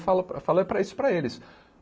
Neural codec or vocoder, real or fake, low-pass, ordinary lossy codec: none; real; none; none